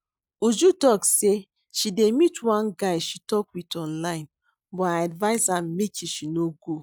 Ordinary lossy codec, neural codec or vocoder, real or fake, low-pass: none; none; real; none